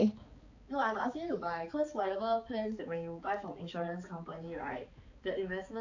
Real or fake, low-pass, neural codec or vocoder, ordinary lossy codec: fake; 7.2 kHz; codec, 16 kHz, 4 kbps, X-Codec, HuBERT features, trained on balanced general audio; AAC, 48 kbps